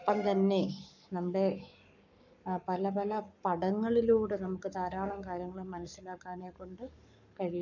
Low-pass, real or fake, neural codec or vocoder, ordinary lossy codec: 7.2 kHz; fake; codec, 44.1 kHz, 7.8 kbps, Pupu-Codec; none